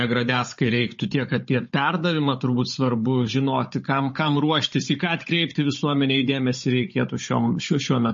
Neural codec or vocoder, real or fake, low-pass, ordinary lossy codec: codec, 16 kHz, 16 kbps, FunCodec, trained on Chinese and English, 50 frames a second; fake; 7.2 kHz; MP3, 32 kbps